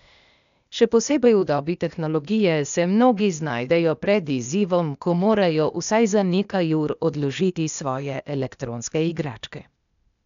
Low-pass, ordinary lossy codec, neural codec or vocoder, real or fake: 7.2 kHz; none; codec, 16 kHz, 0.8 kbps, ZipCodec; fake